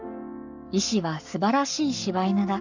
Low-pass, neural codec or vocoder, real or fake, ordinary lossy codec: 7.2 kHz; codec, 44.1 kHz, 7.8 kbps, Pupu-Codec; fake; none